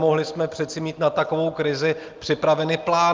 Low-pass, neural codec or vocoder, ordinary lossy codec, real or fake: 7.2 kHz; none; Opus, 32 kbps; real